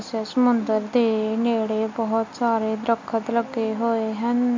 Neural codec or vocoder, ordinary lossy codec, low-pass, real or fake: none; MP3, 48 kbps; 7.2 kHz; real